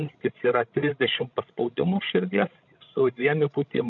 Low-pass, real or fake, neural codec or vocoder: 7.2 kHz; fake; codec, 16 kHz, 16 kbps, FreqCodec, larger model